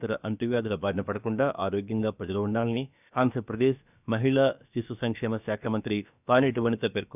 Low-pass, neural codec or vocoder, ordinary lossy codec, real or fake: 3.6 kHz; codec, 16 kHz, about 1 kbps, DyCAST, with the encoder's durations; none; fake